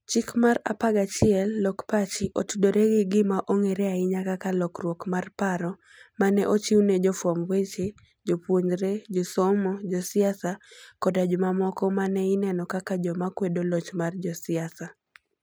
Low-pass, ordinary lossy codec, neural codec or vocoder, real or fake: none; none; none; real